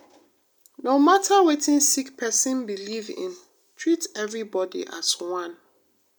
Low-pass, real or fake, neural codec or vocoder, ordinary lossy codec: none; real; none; none